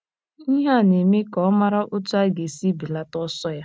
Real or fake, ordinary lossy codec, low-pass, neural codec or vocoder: real; none; none; none